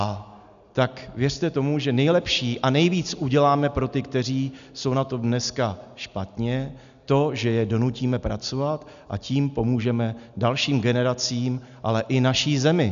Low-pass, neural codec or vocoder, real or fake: 7.2 kHz; none; real